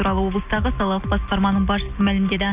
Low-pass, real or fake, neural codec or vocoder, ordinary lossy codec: 3.6 kHz; real; none; Opus, 64 kbps